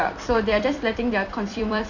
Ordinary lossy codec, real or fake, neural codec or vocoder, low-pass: none; fake; vocoder, 44.1 kHz, 128 mel bands every 256 samples, BigVGAN v2; 7.2 kHz